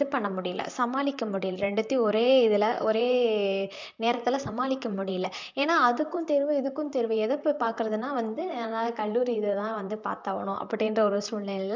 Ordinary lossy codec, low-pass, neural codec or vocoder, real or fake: MP3, 64 kbps; 7.2 kHz; vocoder, 44.1 kHz, 128 mel bands, Pupu-Vocoder; fake